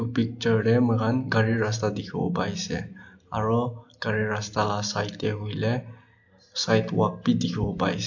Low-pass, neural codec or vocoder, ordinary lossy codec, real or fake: 7.2 kHz; none; AAC, 48 kbps; real